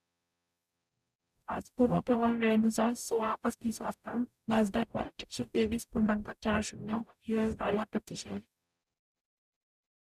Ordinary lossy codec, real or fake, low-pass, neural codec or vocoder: none; fake; 14.4 kHz; codec, 44.1 kHz, 0.9 kbps, DAC